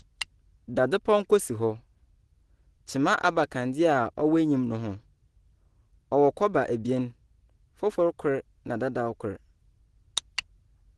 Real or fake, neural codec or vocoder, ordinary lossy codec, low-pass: real; none; Opus, 16 kbps; 10.8 kHz